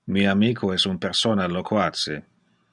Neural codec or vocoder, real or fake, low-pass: none; real; 10.8 kHz